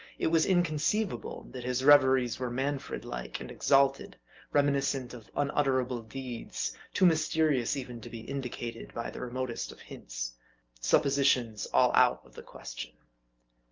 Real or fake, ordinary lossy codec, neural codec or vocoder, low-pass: real; Opus, 24 kbps; none; 7.2 kHz